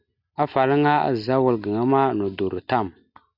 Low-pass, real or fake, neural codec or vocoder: 5.4 kHz; real; none